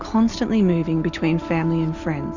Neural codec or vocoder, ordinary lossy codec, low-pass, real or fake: none; Opus, 64 kbps; 7.2 kHz; real